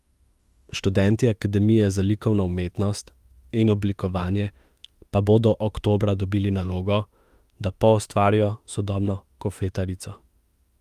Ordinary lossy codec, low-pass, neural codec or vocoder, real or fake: Opus, 32 kbps; 14.4 kHz; autoencoder, 48 kHz, 32 numbers a frame, DAC-VAE, trained on Japanese speech; fake